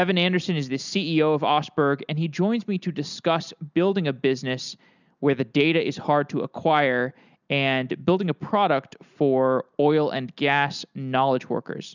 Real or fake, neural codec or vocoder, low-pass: real; none; 7.2 kHz